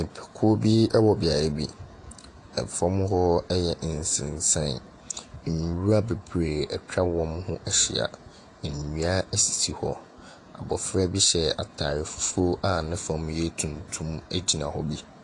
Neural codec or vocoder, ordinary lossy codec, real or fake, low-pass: vocoder, 48 kHz, 128 mel bands, Vocos; AAC, 48 kbps; fake; 10.8 kHz